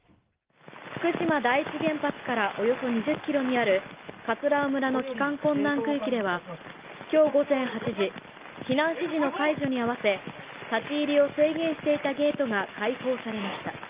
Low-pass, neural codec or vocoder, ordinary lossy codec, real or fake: 3.6 kHz; none; Opus, 32 kbps; real